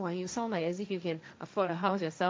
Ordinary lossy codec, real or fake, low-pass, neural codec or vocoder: none; fake; none; codec, 16 kHz, 1.1 kbps, Voila-Tokenizer